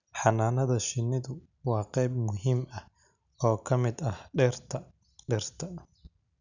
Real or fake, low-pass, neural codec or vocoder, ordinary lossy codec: real; 7.2 kHz; none; none